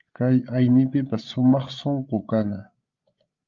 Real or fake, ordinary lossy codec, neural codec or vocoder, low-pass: fake; Opus, 32 kbps; codec, 16 kHz, 8 kbps, FreqCodec, larger model; 7.2 kHz